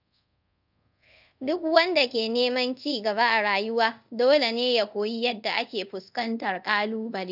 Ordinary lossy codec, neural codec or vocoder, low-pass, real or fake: none; codec, 24 kHz, 0.5 kbps, DualCodec; 5.4 kHz; fake